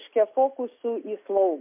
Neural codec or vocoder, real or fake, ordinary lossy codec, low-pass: none; real; MP3, 32 kbps; 3.6 kHz